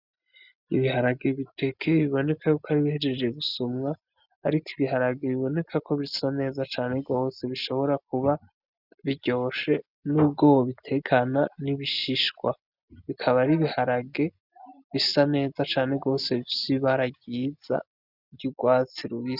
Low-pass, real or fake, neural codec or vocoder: 5.4 kHz; real; none